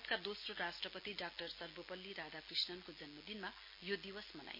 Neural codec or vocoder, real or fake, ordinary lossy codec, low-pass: none; real; MP3, 24 kbps; 5.4 kHz